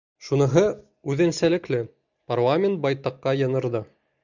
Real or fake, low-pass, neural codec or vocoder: real; 7.2 kHz; none